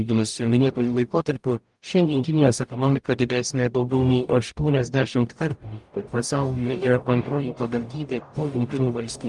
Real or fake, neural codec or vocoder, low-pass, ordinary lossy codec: fake; codec, 44.1 kHz, 0.9 kbps, DAC; 10.8 kHz; Opus, 32 kbps